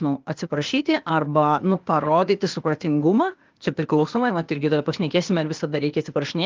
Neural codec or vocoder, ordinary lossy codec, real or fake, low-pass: codec, 16 kHz, 0.8 kbps, ZipCodec; Opus, 32 kbps; fake; 7.2 kHz